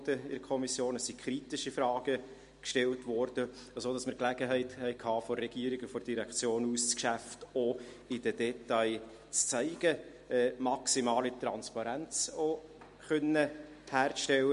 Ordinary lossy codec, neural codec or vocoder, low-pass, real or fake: MP3, 48 kbps; none; 10.8 kHz; real